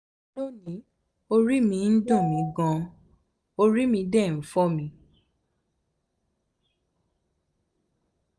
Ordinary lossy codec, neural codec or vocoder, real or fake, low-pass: none; none; real; none